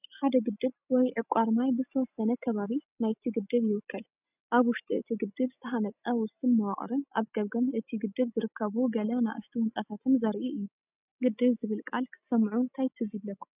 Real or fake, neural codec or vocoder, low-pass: real; none; 3.6 kHz